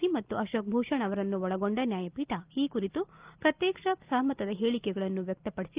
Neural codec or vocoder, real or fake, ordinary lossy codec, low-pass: none; real; Opus, 32 kbps; 3.6 kHz